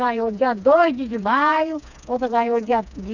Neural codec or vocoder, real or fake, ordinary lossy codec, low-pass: codec, 16 kHz, 2 kbps, FreqCodec, smaller model; fake; none; 7.2 kHz